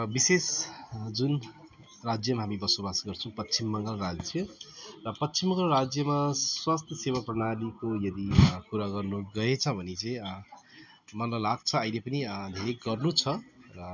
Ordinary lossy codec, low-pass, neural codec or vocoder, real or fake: none; 7.2 kHz; none; real